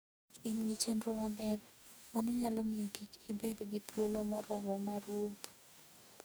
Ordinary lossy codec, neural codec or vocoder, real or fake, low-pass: none; codec, 44.1 kHz, 2.6 kbps, DAC; fake; none